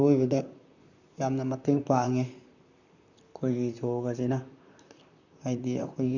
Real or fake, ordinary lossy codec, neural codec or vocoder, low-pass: fake; none; vocoder, 44.1 kHz, 128 mel bands, Pupu-Vocoder; 7.2 kHz